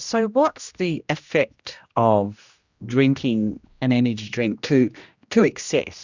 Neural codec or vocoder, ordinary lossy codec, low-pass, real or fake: codec, 16 kHz, 1 kbps, X-Codec, HuBERT features, trained on general audio; Opus, 64 kbps; 7.2 kHz; fake